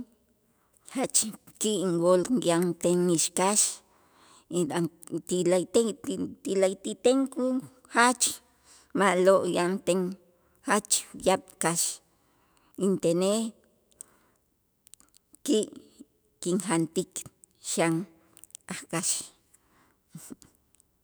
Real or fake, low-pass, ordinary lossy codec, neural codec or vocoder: fake; none; none; autoencoder, 48 kHz, 128 numbers a frame, DAC-VAE, trained on Japanese speech